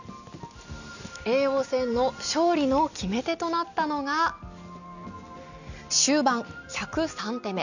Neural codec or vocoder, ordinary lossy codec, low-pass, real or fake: none; none; 7.2 kHz; real